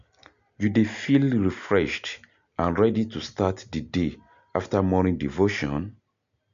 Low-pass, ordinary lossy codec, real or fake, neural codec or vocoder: 7.2 kHz; MP3, 64 kbps; real; none